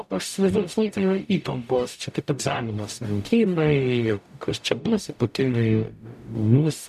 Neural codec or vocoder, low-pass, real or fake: codec, 44.1 kHz, 0.9 kbps, DAC; 14.4 kHz; fake